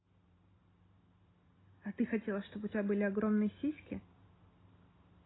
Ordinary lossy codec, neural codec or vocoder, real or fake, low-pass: AAC, 16 kbps; none; real; 7.2 kHz